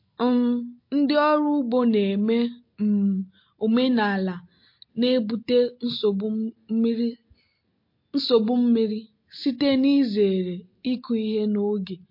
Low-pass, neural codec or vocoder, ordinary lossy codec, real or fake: 5.4 kHz; none; MP3, 32 kbps; real